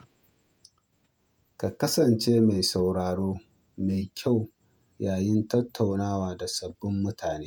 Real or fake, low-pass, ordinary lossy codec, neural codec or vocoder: fake; none; none; vocoder, 48 kHz, 128 mel bands, Vocos